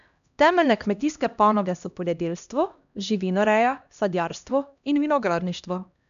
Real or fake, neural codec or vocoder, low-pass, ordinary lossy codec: fake; codec, 16 kHz, 1 kbps, X-Codec, HuBERT features, trained on LibriSpeech; 7.2 kHz; none